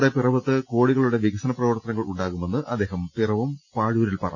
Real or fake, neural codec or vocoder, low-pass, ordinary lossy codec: real; none; 7.2 kHz; none